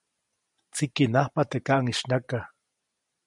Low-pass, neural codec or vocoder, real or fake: 10.8 kHz; none; real